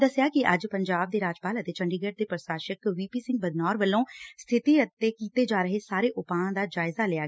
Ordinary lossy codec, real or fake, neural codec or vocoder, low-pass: none; real; none; none